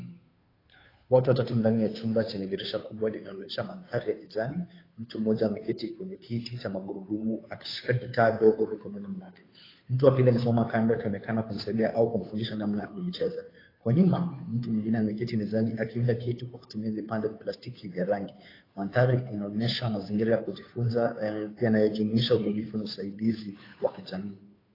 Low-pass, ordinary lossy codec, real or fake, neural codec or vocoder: 5.4 kHz; AAC, 24 kbps; fake; codec, 16 kHz, 2 kbps, FunCodec, trained on Chinese and English, 25 frames a second